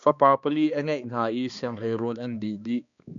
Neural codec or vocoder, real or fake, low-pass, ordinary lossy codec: codec, 16 kHz, 2 kbps, X-Codec, HuBERT features, trained on balanced general audio; fake; 7.2 kHz; MP3, 96 kbps